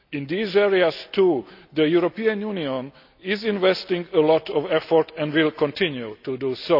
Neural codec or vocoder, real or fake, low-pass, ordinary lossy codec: none; real; 5.4 kHz; none